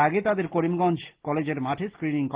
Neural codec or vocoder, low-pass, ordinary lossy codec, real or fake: none; 3.6 kHz; Opus, 16 kbps; real